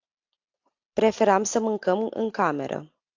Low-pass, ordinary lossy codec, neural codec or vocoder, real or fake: 7.2 kHz; AAC, 48 kbps; none; real